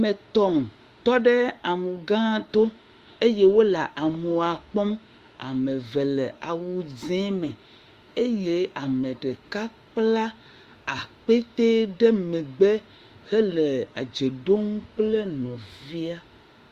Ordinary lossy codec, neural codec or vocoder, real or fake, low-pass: Opus, 32 kbps; autoencoder, 48 kHz, 32 numbers a frame, DAC-VAE, trained on Japanese speech; fake; 14.4 kHz